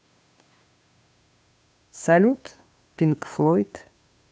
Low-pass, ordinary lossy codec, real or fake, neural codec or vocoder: none; none; fake; codec, 16 kHz, 2 kbps, FunCodec, trained on Chinese and English, 25 frames a second